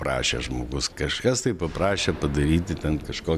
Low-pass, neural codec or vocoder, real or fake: 14.4 kHz; none; real